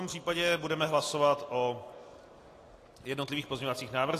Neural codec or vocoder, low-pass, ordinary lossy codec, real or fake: none; 14.4 kHz; AAC, 48 kbps; real